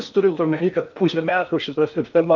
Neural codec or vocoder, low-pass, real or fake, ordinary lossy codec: codec, 16 kHz in and 24 kHz out, 0.8 kbps, FocalCodec, streaming, 65536 codes; 7.2 kHz; fake; MP3, 64 kbps